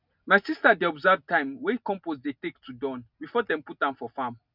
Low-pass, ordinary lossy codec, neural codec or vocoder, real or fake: 5.4 kHz; none; none; real